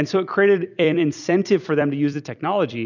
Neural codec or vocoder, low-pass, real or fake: vocoder, 44.1 kHz, 128 mel bands every 256 samples, BigVGAN v2; 7.2 kHz; fake